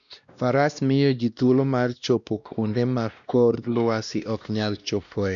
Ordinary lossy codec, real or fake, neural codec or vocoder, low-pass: none; fake; codec, 16 kHz, 1 kbps, X-Codec, WavLM features, trained on Multilingual LibriSpeech; 7.2 kHz